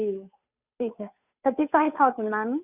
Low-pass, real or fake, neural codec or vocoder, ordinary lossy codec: 3.6 kHz; fake; codec, 16 kHz, 2 kbps, FunCodec, trained on Chinese and English, 25 frames a second; none